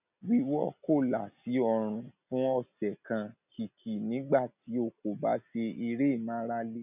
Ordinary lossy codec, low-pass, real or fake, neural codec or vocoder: none; 3.6 kHz; real; none